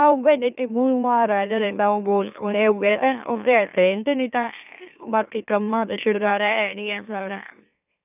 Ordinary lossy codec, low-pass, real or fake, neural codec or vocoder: none; 3.6 kHz; fake; autoencoder, 44.1 kHz, a latent of 192 numbers a frame, MeloTTS